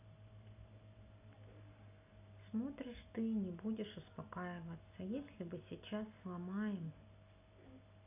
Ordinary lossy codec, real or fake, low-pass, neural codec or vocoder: none; real; 3.6 kHz; none